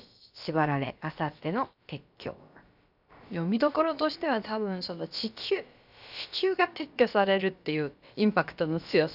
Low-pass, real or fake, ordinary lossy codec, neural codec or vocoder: 5.4 kHz; fake; none; codec, 16 kHz, about 1 kbps, DyCAST, with the encoder's durations